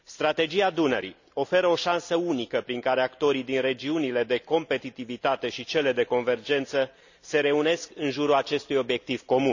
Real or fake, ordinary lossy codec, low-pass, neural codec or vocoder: real; none; 7.2 kHz; none